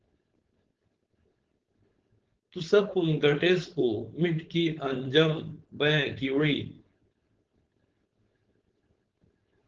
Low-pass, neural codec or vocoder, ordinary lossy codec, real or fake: 7.2 kHz; codec, 16 kHz, 4.8 kbps, FACodec; Opus, 16 kbps; fake